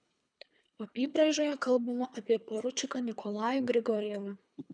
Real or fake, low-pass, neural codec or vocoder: fake; 9.9 kHz; codec, 24 kHz, 3 kbps, HILCodec